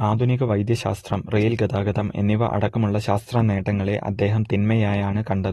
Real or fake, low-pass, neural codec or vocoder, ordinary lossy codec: real; 19.8 kHz; none; AAC, 32 kbps